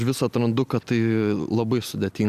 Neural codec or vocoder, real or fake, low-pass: none; real; 14.4 kHz